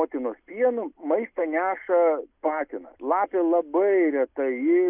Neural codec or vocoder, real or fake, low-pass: none; real; 3.6 kHz